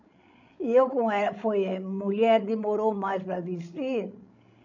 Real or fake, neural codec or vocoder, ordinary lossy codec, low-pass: fake; codec, 16 kHz, 16 kbps, FreqCodec, larger model; none; 7.2 kHz